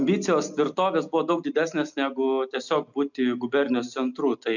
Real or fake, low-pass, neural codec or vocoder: real; 7.2 kHz; none